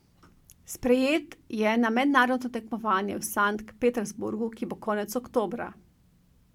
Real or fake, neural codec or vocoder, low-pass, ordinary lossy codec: real; none; 19.8 kHz; MP3, 96 kbps